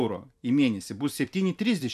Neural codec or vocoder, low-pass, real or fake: vocoder, 44.1 kHz, 128 mel bands every 256 samples, BigVGAN v2; 14.4 kHz; fake